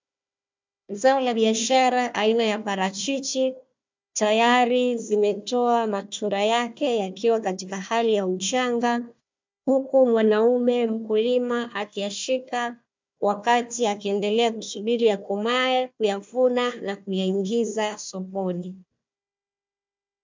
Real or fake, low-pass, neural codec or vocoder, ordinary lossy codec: fake; 7.2 kHz; codec, 16 kHz, 1 kbps, FunCodec, trained on Chinese and English, 50 frames a second; MP3, 64 kbps